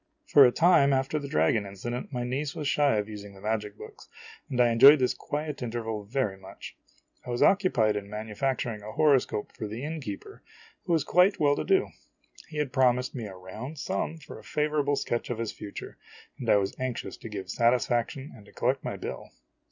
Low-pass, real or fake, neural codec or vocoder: 7.2 kHz; real; none